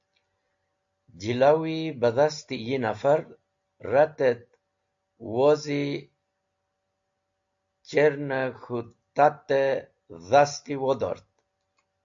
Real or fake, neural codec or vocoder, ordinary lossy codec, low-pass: real; none; AAC, 64 kbps; 7.2 kHz